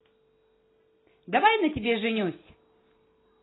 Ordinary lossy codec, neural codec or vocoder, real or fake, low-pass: AAC, 16 kbps; none; real; 7.2 kHz